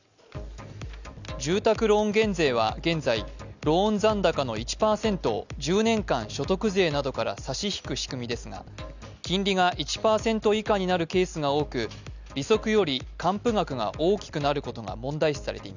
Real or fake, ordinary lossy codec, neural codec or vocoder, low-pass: real; none; none; 7.2 kHz